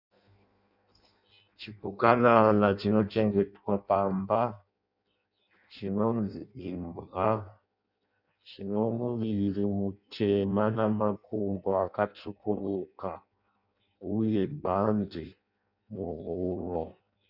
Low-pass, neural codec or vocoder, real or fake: 5.4 kHz; codec, 16 kHz in and 24 kHz out, 0.6 kbps, FireRedTTS-2 codec; fake